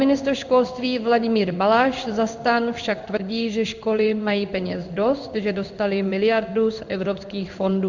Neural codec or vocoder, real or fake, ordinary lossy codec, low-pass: codec, 16 kHz in and 24 kHz out, 1 kbps, XY-Tokenizer; fake; Opus, 64 kbps; 7.2 kHz